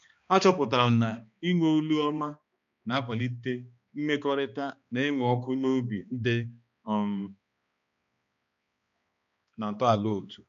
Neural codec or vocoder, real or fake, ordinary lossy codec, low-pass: codec, 16 kHz, 2 kbps, X-Codec, HuBERT features, trained on balanced general audio; fake; MP3, 64 kbps; 7.2 kHz